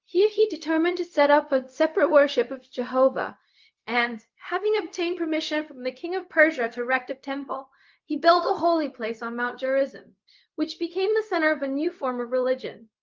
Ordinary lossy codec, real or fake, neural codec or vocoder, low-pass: Opus, 32 kbps; fake; codec, 16 kHz, 0.4 kbps, LongCat-Audio-Codec; 7.2 kHz